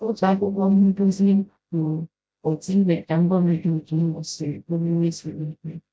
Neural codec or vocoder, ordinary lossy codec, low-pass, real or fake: codec, 16 kHz, 0.5 kbps, FreqCodec, smaller model; none; none; fake